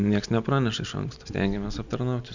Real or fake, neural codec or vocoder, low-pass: real; none; 7.2 kHz